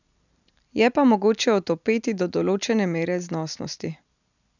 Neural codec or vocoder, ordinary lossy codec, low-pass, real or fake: none; none; 7.2 kHz; real